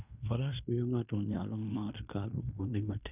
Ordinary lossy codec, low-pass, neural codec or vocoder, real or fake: none; 3.6 kHz; codec, 16 kHz in and 24 kHz out, 0.9 kbps, LongCat-Audio-Codec, fine tuned four codebook decoder; fake